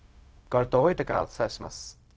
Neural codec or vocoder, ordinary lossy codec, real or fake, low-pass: codec, 16 kHz, 0.4 kbps, LongCat-Audio-Codec; none; fake; none